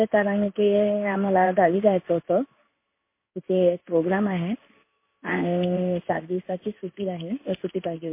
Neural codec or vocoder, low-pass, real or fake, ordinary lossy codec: codec, 16 kHz in and 24 kHz out, 1 kbps, XY-Tokenizer; 3.6 kHz; fake; MP3, 24 kbps